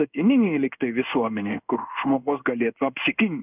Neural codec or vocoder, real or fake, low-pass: codec, 16 kHz in and 24 kHz out, 1 kbps, XY-Tokenizer; fake; 3.6 kHz